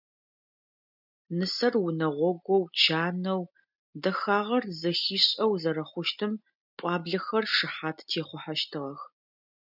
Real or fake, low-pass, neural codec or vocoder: real; 5.4 kHz; none